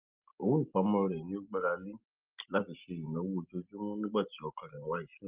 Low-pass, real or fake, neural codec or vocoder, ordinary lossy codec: 3.6 kHz; real; none; Opus, 24 kbps